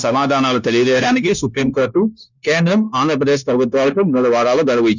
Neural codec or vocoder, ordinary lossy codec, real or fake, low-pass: codec, 16 kHz, 0.9 kbps, LongCat-Audio-Codec; none; fake; 7.2 kHz